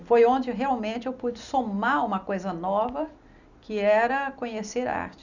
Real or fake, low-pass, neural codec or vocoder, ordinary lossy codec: real; 7.2 kHz; none; none